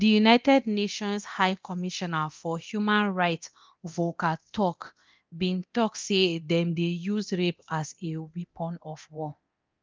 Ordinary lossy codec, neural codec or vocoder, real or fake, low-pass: Opus, 24 kbps; codec, 24 kHz, 0.9 kbps, DualCodec; fake; 7.2 kHz